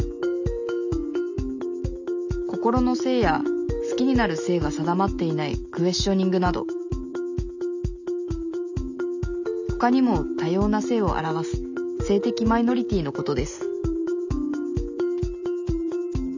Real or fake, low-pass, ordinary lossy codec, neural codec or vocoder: real; 7.2 kHz; none; none